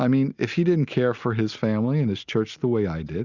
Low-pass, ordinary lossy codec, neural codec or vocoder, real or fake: 7.2 kHz; Opus, 64 kbps; none; real